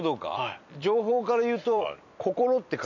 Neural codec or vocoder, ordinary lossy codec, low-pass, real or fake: vocoder, 44.1 kHz, 128 mel bands every 256 samples, BigVGAN v2; none; 7.2 kHz; fake